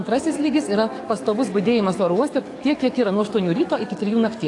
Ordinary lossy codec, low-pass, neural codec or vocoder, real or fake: AAC, 48 kbps; 10.8 kHz; codec, 44.1 kHz, 7.8 kbps, DAC; fake